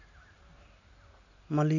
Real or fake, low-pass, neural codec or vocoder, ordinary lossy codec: real; 7.2 kHz; none; AAC, 48 kbps